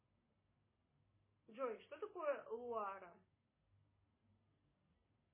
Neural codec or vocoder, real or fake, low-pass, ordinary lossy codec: none; real; 3.6 kHz; MP3, 16 kbps